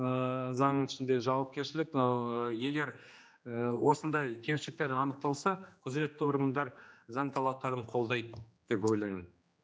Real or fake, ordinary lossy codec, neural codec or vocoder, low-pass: fake; none; codec, 16 kHz, 2 kbps, X-Codec, HuBERT features, trained on general audio; none